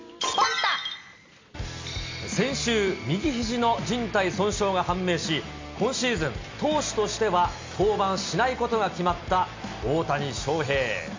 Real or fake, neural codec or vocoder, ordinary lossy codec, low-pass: real; none; MP3, 64 kbps; 7.2 kHz